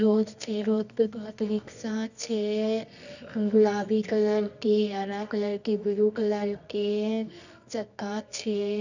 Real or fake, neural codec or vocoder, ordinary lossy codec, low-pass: fake; codec, 24 kHz, 0.9 kbps, WavTokenizer, medium music audio release; none; 7.2 kHz